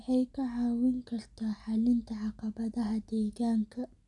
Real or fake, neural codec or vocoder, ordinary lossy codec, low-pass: real; none; none; 10.8 kHz